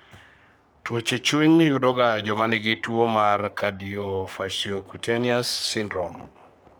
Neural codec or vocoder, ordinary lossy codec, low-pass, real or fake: codec, 44.1 kHz, 3.4 kbps, Pupu-Codec; none; none; fake